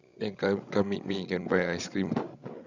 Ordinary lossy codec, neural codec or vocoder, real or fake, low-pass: none; codec, 16 kHz, 16 kbps, FreqCodec, larger model; fake; 7.2 kHz